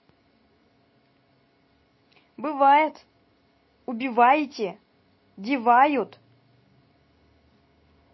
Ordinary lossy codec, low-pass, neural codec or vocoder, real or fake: MP3, 24 kbps; 7.2 kHz; none; real